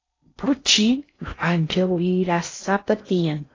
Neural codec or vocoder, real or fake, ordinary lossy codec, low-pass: codec, 16 kHz in and 24 kHz out, 0.6 kbps, FocalCodec, streaming, 4096 codes; fake; AAC, 32 kbps; 7.2 kHz